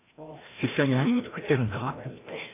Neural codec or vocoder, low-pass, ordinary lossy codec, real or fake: codec, 16 kHz, 1 kbps, FreqCodec, larger model; 3.6 kHz; AAC, 24 kbps; fake